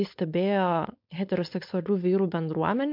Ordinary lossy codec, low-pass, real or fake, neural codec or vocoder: MP3, 48 kbps; 5.4 kHz; fake; codec, 16 kHz, 4.8 kbps, FACodec